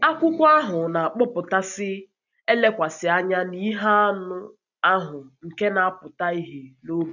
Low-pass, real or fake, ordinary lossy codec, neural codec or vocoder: 7.2 kHz; real; none; none